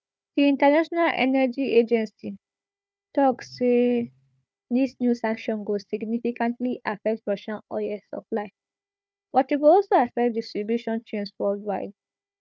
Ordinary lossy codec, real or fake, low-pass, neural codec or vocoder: none; fake; none; codec, 16 kHz, 4 kbps, FunCodec, trained on Chinese and English, 50 frames a second